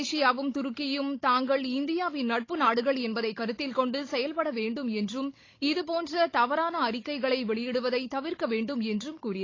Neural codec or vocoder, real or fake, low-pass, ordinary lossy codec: codec, 16 kHz, 16 kbps, FunCodec, trained on Chinese and English, 50 frames a second; fake; 7.2 kHz; AAC, 32 kbps